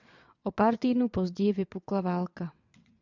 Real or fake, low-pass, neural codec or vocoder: fake; 7.2 kHz; vocoder, 22.05 kHz, 80 mel bands, WaveNeXt